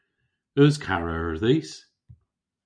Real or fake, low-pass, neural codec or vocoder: real; 9.9 kHz; none